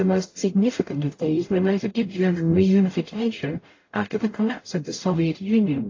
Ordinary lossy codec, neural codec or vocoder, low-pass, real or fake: AAC, 32 kbps; codec, 44.1 kHz, 0.9 kbps, DAC; 7.2 kHz; fake